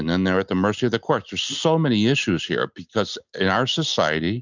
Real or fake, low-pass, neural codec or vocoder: real; 7.2 kHz; none